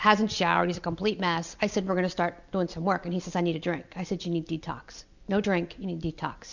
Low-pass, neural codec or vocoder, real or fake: 7.2 kHz; none; real